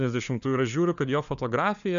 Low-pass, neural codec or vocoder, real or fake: 7.2 kHz; codec, 16 kHz, 4 kbps, FunCodec, trained on LibriTTS, 50 frames a second; fake